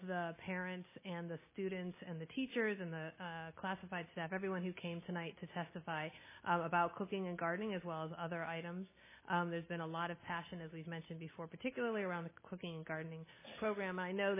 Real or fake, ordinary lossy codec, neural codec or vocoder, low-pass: real; MP3, 16 kbps; none; 3.6 kHz